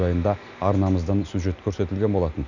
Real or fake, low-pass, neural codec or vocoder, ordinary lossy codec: real; 7.2 kHz; none; none